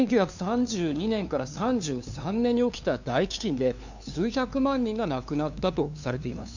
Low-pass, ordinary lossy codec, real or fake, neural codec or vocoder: 7.2 kHz; none; fake; codec, 16 kHz, 2 kbps, FunCodec, trained on LibriTTS, 25 frames a second